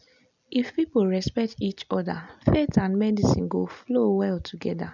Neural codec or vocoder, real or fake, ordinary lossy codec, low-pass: none; real; none; 7.2 kHz